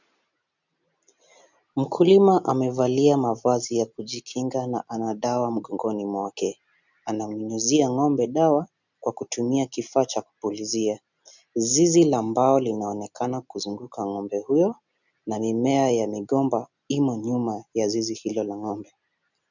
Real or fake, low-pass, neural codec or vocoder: real; 7.2 kHz; none